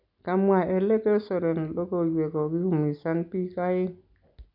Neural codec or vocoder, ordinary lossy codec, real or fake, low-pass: none; none; real; 5.4 kHz